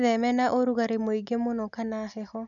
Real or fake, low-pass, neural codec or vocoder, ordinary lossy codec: real; 7.2 kHz; none; none